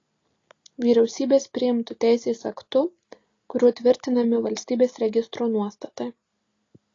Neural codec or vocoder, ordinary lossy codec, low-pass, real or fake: none; AAC, 32 kbps; 7.2 kHz; real